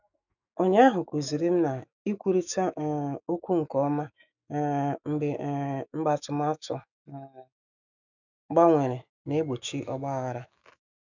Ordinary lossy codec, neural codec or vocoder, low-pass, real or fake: none; autoencoder, 48 kHz, 128 numbers a frame, DAC-VAE, trained on Japanese speech; 7.2 kHz; fake